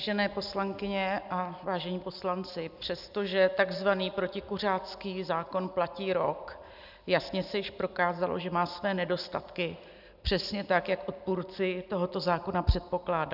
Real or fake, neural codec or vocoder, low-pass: real; none; 5.4 kHz